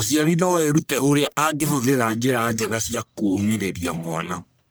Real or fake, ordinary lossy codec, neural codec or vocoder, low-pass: fake; none; codec, 44.1 kHz, 1.7 kbps, Pupu-Codec; none